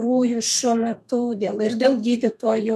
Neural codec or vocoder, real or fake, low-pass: codec, 32 kHz, 1.9 kbps, SNAC; fake; 14.4 kHz